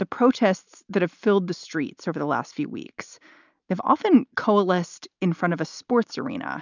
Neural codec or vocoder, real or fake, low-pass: none; real; 7.2 kHz